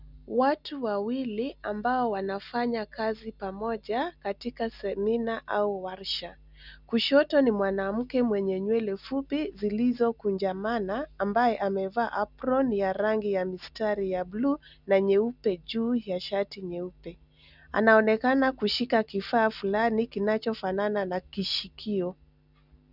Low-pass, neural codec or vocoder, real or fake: 5.4 kHz; none; real